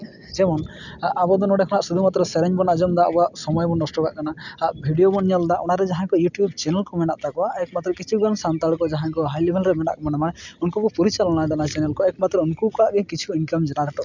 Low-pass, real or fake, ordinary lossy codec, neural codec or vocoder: 7.2 kHz; real; none; none